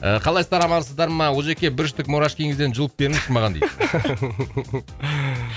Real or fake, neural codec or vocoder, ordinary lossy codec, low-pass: real; none; none; none